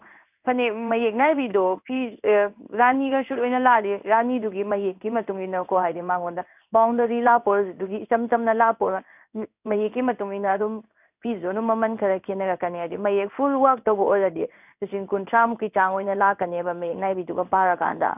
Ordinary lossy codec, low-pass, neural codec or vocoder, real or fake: none; 3.6 kHz; codec, 16 kHz in and 24 kHz out, 1 kbps, XY-Tokenizer; fake